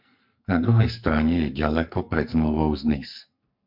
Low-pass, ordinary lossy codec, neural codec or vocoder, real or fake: 5.4 kHz; MP3, 48 kbps; codec, 44.1 kHz, 2.6 kbps, SNAC; fake